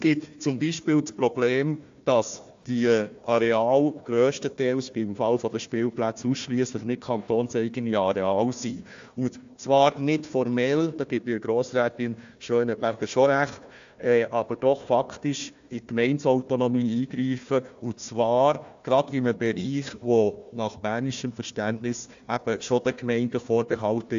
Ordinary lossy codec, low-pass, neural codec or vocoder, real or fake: AAC, 64 kbps; 7.2 kHz; codec, 16 kHz, 1 kbps, FunCodec, trained on Chinese and English, 50 frames a second; fake